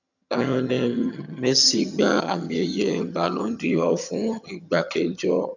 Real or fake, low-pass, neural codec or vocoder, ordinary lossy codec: fake; 7.2 kHz; vocoder, 22.05 kHz, 80 mel bands, HiFi-GAN; none